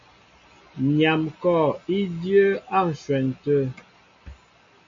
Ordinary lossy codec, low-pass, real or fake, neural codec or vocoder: MP3, 48 kbps; 7.2 kHz; real; none